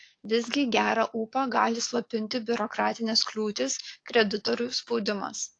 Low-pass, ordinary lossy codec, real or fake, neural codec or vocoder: 9.9 kHz; AAC, 48 kbps; fake; codec, 44.1 kHz, 7.8 kbps, DAC